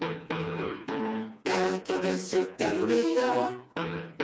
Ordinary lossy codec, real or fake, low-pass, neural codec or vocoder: none; fake; none; codec, 16 kHz, 2 kbps, FreqCodec, smaller model